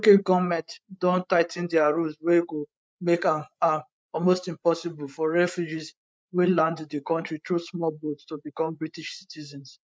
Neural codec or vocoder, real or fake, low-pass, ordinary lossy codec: codec, 16 kHz, 8 kbps, FreqCodec, larger model; fake; none; none